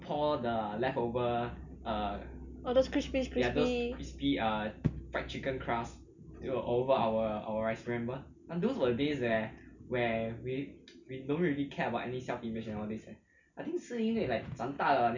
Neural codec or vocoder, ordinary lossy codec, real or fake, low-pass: none; none; real; 7.2 kHz